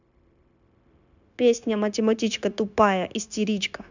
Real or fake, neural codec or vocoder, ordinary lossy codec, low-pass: fake; codec, 16 kHz, 0.9 kbps, LongCat-Audio-Codec; none; 7.2 kHz